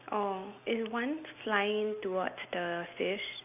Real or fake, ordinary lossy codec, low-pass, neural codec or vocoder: real; none; 3.6 kHz; none